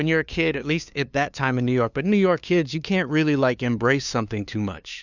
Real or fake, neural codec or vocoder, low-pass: fake; codec, 16 kHz, 2 kbps, FunCodec, trained on LibriTTS, 25 frames a second; 7.2 kHz